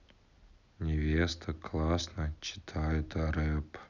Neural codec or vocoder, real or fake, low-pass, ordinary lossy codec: none; real; 7.2 kHz; none